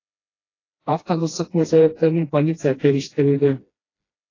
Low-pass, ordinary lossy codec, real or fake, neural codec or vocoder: 7.2 kHz; AAC, 32 kbps; fake; codec, 16 kHz, 1 kbps, FreqCodec, smaller model